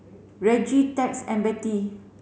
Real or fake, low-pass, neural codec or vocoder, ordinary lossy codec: real; none; none; none